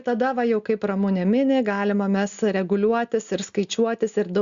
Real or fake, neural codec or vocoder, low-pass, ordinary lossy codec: real; none; 7.2 kHz; Opus, 64 kbps